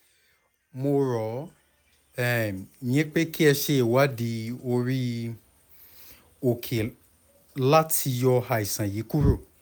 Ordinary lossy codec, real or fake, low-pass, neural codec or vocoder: none; real; none; none